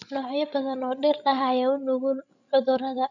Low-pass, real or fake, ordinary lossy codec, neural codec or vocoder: 7.2 kHz; fake; none; codec, 16 kHz, 16 kbps, FreqCodec, larger model